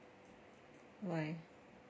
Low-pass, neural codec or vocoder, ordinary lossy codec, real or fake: none; none; none; real